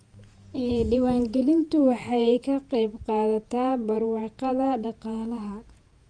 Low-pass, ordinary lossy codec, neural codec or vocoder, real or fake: 9.9 kHz; Opus, 32 kbps; vocoder, 48 kHz, 128 mel bands, Vocos; fake